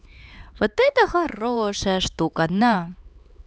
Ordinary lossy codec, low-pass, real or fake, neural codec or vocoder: none; none; fake; codec, 16 kHz, 4 kbps, X-Codec, HuBERT features, trained on LibriSpeech